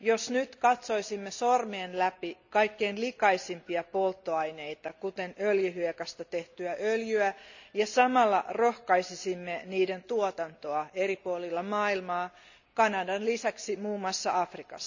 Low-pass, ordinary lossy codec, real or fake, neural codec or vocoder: 7.2 kHz; none; real; none